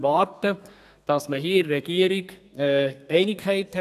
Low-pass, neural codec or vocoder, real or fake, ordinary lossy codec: 14.4 kHz; codec, 32 kHz, 1.9 kbps, SNAC; fake; none